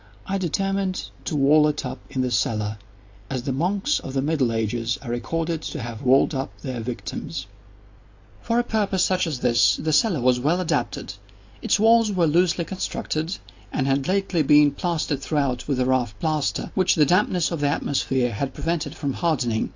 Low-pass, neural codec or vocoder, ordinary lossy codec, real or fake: 7.2 kHz; none; AAC, 48 kbps; real